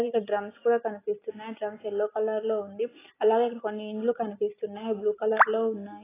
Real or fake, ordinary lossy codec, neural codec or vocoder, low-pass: real; AAC, 16 kbps; none; 3.6 kHz